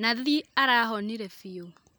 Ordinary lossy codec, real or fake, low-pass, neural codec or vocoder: none; real; none; none